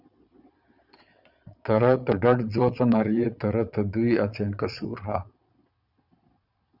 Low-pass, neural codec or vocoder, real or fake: 5.4 kHz; vocoder, 22.05 kHz, 80 mel bands, Vocos; fake